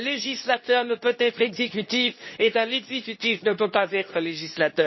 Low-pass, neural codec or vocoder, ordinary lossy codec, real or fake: 7.2 kHz; codec, 24 kHz, 0.9 kbps, WavTokenizer, medium speech release version 2; MP3, 24 kbps; fake